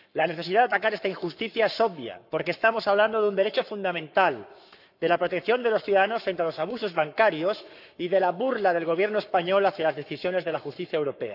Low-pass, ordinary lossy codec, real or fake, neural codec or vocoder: 5.4 kHz; none; fake; codec, 44.1 kHz, 7.8 kbps, Pupu-Codec